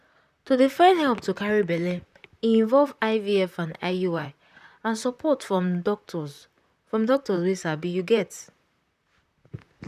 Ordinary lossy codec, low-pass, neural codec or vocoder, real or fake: none; 14.4 kHz; vocoder, 44.1 kHz, 128 mel bands, Pupu-Vocoder; fake